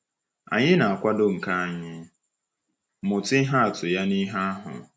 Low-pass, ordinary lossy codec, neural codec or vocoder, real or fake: none; none; none; real